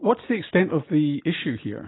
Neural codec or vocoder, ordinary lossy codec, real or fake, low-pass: none; AAC, 16 kbps; real; 7.2 kHz